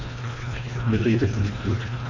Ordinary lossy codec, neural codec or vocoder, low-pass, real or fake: MP3, 48 kbps; codec, 24 kHz, 1.5 kbps, HILCodec; 7.2 kHz; fake